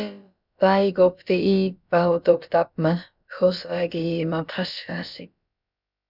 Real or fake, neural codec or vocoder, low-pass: fake; codec, 16 kHz, about 1 kbps, DyCAST, with the encoder's durations; 5.4 kHz